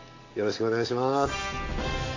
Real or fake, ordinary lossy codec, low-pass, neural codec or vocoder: real; AAC, 32 kbps; 7.2 kHz; none